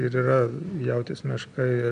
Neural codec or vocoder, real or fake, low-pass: none; real; 9.9 kHz